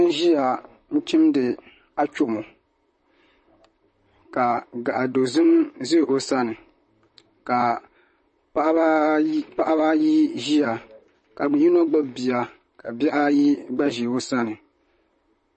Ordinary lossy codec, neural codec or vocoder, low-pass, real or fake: MP3, 32 kbps; vocoder, 44.1 kHz, 128 mel bands, Pupu-Vocoder; 10.8 kHz; fake